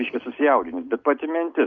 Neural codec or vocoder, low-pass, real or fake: none; 7.2 kHz; real